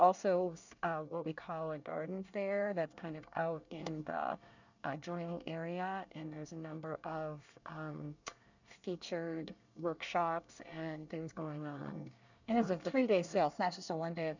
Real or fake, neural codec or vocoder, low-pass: fake; codec, 24 kHz, 1 kbps, SNAC; 7.2 kHz